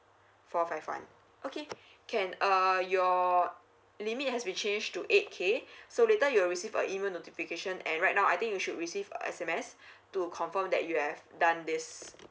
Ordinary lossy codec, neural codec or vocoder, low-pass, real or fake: none; none; none; real